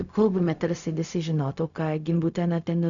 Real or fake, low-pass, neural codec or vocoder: fake; 7.2 kHz; codec, 16 kHz, 0.4 kbps, LongCat-Audio-Codec